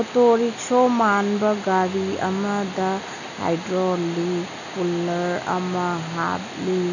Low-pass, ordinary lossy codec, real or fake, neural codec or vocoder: 7.2 kHz; none; real; none